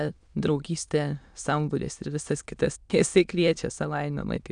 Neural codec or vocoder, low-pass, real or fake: autoencoder, 22.05 kHz, a latent of 192 numbers a frame, VITS, trained on many speakers; 9.9 kHz; fake